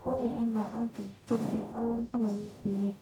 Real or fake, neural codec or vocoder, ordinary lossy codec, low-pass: fake; codec, 44.1 kHz, 0.9 kbps, DAC; none; 19.8 kHz